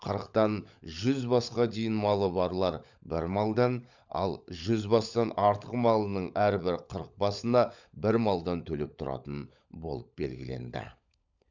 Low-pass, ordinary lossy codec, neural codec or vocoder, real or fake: 7.2 kHz; none; codec, 16 kHz, 16 kbps, FunCodec, trained on LibriTTS, 50 frames a second; fake